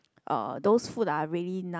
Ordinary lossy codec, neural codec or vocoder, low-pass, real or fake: none; none; none; real